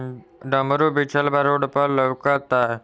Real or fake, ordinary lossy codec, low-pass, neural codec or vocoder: real; none; none; none